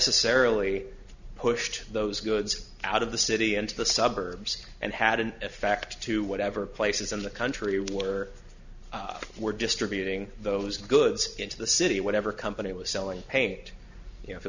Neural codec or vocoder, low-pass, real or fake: none; 7.2 kHz; real